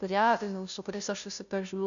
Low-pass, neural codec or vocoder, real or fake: 7.2 kHz; codec, 16 kHz, 0.5 kbps, FunCodec, trained on Chinese and English, 25 frames a second; fake